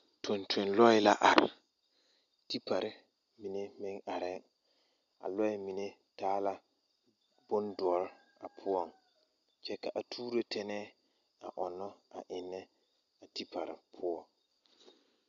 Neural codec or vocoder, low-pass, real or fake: none; 7.2 kHz; real